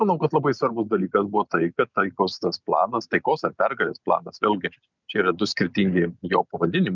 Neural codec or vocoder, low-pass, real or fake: none; 7.2 kHz; real